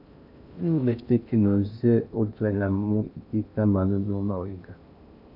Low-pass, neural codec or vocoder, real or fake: 5.4 kHz; codec, 16 kHz in and 24 kHz out, 0.6 kbps, FocalCodec, streaming, 2048 codes; fake